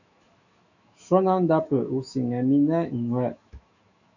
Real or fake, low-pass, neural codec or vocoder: fake; 7.2 kHz; autoencoder, 48 kHz, 128 numbers a frame, DAC-VAE, trained on Japanese speech